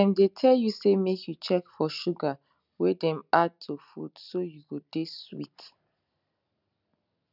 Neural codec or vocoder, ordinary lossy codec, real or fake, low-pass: none; none; real; 5.4 kHz